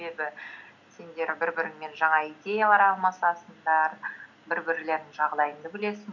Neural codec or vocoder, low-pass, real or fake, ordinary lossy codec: none; 7.2 kHz; real; none